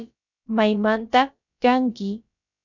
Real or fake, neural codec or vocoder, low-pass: fake; codec, 16 kHz, about 1 kbps, DyCAST, with the encoder's durations; 7.2 kHz